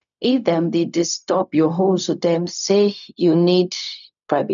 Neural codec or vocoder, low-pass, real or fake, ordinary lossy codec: codec, 16 kHz, 0.4 kbps, LongCat-Audio-Codec; 7.2 kHz; fake; none